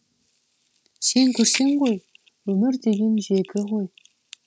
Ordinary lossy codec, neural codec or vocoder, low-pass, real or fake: none; none; none; real